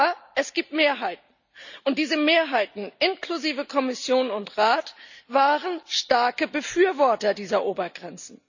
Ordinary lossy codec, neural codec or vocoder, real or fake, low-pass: none; none; real; 7.2 kHz